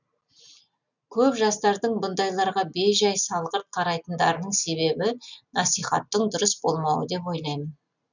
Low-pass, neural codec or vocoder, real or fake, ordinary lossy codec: 7.2 kHz; none; real; none